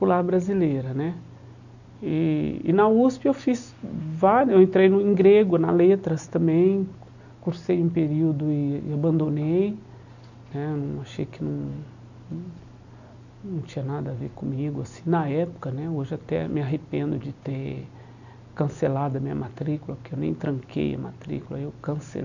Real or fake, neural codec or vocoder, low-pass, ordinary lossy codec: real; none; 7.2 kHz; none